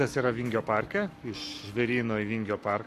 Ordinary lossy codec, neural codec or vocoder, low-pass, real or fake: AAC, 64 kbps; codec, 44.1 kHz, 7.8 kbps, Pupu-Codec; 14.4 kHz; fake